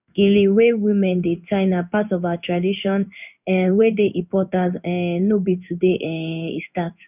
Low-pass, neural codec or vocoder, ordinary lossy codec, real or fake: 3.6 kHz; codec, 16 kHz in and 24 kHz out, 1 kbps, XY-Tokenizer; none; fake